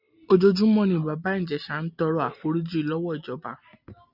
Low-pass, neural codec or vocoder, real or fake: 5.4 kHz; none; real